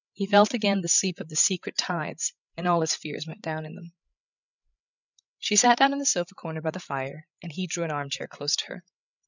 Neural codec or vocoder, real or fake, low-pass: codec, 16 kHz, 16 kbps, FreqCodec, larger model; fake; 7.2 kHz